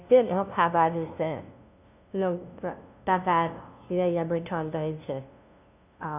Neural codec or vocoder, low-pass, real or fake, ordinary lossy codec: codec, 16 kHz, 0.5 kbps, FunCodec, trained on LibriTTS, 25 frames a second; 3.6 kHz; fake; none